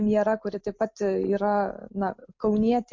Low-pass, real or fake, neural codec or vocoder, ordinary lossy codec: 7.2 kHz; real; none; MP3, 48 kbps